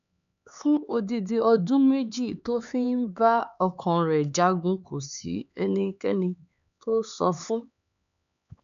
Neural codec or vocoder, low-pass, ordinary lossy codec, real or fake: codec, 16 kHz, 4 kbps, X-Codec, HuBERT features, trained on LibriSpeech; 7.2 kHz; none; fake